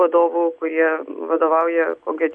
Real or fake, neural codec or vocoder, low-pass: real; none; 9.9 kHz